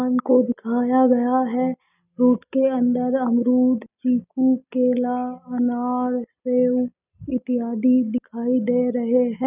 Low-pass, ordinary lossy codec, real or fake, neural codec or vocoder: 3.6 kHz; none; real; none